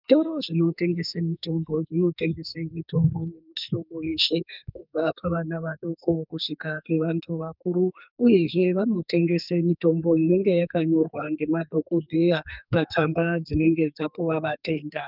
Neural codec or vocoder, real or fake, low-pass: codec, 44.1 kHz, 2.6 kbps, SNAC; fake; 5.4 kHz